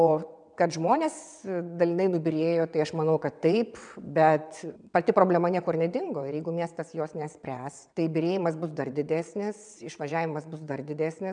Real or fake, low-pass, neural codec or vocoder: fake; 9.9 kHz; vocoder, 22.05 kHz, 80 mel bands, WaveNeXt